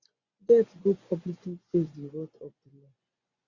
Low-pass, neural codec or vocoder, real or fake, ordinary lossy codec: 7.2 kHz; vocoder, 24 kHz, 100 mel bands, Vocos; fake; Opus, 64 kbps